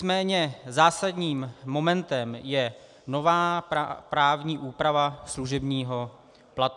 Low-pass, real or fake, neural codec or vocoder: 10.8 kHz; real; none